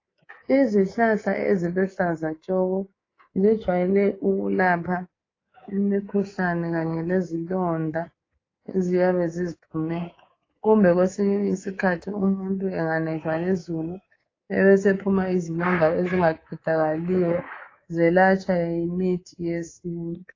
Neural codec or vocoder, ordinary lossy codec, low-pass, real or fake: codec, 24 kHz, 3.1 kbps, DualCodec; AAC, 32 kbps; 7.2 kHz; fake